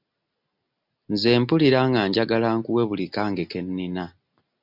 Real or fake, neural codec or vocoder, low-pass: real; none; 5.4 kHz